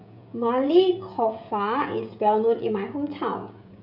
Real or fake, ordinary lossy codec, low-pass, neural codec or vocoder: fake; none; 5.4 kHz; codec, 16 kHz, 16 kbps, FreqCodec, smaller model